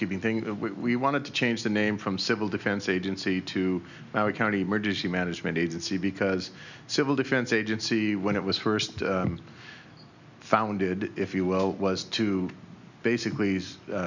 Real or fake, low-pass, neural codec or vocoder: real; 7.2 kHz; none